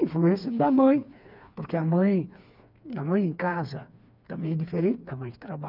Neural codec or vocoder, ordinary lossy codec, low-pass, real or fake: codec, 16 kHz, 4 kbps, FreqCodec, smaller model; none; 5.4 kHz; fake